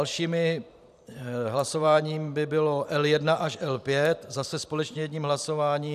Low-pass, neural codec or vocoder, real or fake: 14.4 kHz; vocoder, 44.1 kHz, 128 mel bands every 512 samples, BigVGAN v2; fake